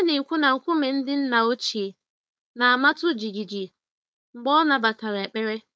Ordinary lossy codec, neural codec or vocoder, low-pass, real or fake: none; codec, 16 kHz, 4.8 kbps, FACodec; none; fake